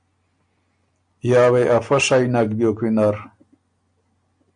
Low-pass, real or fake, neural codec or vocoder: 9.9 kHz; real; none